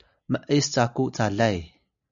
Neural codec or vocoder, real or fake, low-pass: none; real; 7.2 kHz